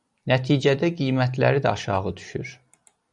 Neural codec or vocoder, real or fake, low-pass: none; real; 10.8 kHz